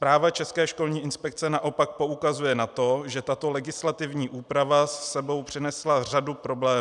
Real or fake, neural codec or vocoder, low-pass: real; none; 10.8 kHz